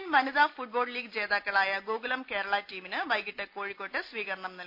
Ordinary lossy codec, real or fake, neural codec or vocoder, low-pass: MP3, 48 kbps; real; none; 5.4 kHz